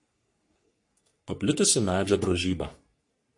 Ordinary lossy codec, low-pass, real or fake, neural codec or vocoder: MP3, 48 kbps; 10.8 kHz; fake; codec, 44.1 kHz, 3.4 kbps, Pupu-Codec